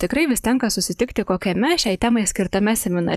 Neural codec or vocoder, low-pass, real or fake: vocoder, 44.1 kHz, 128 mel bands, Pupu-Vocoder; 14.4 kHz; fake